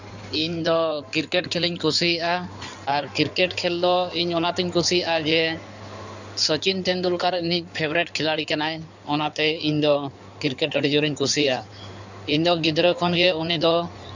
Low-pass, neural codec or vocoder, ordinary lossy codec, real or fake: 7.2 kHz; codec, 16 kHz in and 24 kHz out, 2.2 kbps, FireRedTTS-2 codec; none; fake